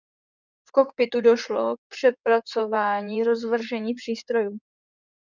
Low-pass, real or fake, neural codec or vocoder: 7.2 kHz; fake; codec, 16 kHz in and 24 kHz out, 2.2 kbps, FireRedTTS-2 codec